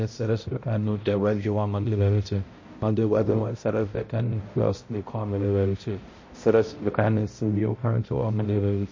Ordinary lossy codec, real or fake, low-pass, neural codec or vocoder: MP3, 32 kbps; fake; 7.2 kHz; codec, 16 kHz, 0.5 kbps, X-Codec, HuBERT features, trained on balanced general audio